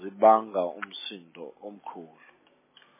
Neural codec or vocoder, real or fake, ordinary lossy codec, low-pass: none; real; MP3, 16 kbps; 3.6 kHz